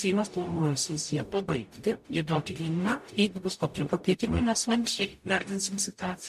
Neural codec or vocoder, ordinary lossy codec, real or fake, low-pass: codec, 44.1 kHz, 0.9 kbps, DAC; MP3, 64 kbps; fake; 14.4 kHz